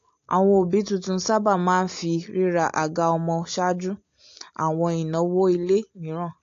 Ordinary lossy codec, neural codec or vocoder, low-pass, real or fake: AAC, 48 kbps; none; 7.2 kHz; real